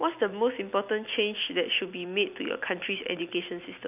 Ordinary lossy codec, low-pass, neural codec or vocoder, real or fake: none; 3.6 kHz; none; real